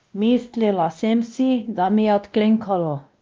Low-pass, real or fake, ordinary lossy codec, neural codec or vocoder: 7.2 kHz; fake; Opus, 24 kbps; codec, 16 kHz, 1 kbps, X-Codec, WavLM features, trained on Multilingual LibriSpeech